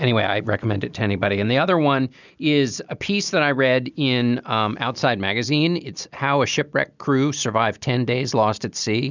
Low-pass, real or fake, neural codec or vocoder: 7.2 kHz; real; none